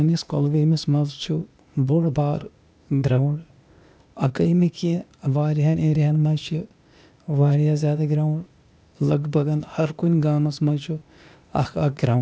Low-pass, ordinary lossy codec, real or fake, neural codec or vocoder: none; none; fake; codec, 16 kHz, 0.8 kbps, ZipCodec